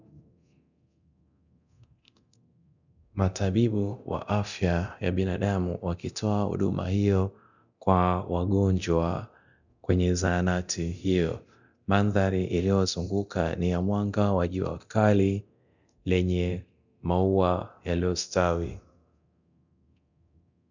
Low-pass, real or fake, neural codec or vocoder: 7.2 kHz; fake; codec, 24 kHz, 0.9 kbps, DualCodec